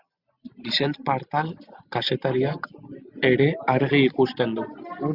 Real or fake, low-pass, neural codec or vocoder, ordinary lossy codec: real; 5.4 kHz; none; Opus, 64 kbps